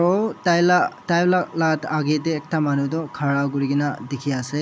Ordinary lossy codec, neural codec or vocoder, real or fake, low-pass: none; none; real; none